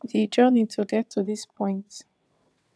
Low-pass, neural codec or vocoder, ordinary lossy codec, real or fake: none; vocoder, 22.05 kHz, 80 mel bands, WaveNeXt; none; fake